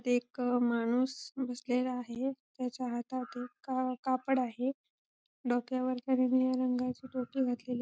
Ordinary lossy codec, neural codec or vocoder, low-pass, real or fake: none; none; none; real